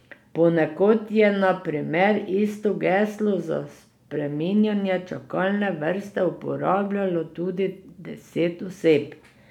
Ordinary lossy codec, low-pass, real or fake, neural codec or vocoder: none; 19.8 kHz; real; none